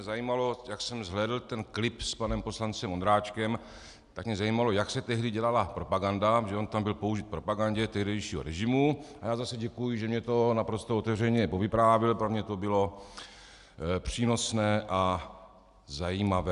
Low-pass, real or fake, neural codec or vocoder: 10.8 kHz; real; none